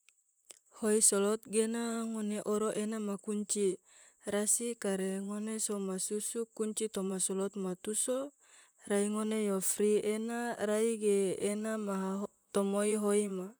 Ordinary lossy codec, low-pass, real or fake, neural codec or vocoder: none; none; fake; vocoder, 44.1 kHz, 128 mel bands, Pupu-Vocoder